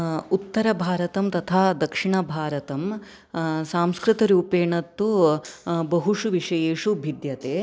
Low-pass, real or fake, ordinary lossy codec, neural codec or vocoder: none; real; none; none